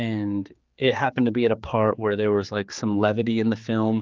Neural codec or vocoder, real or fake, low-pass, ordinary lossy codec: codec, 16 kHz, 4 kbps, X-Codec, HuBERT features, trained on general audio; fake; 7.2 kHz; Opus, 24 kbps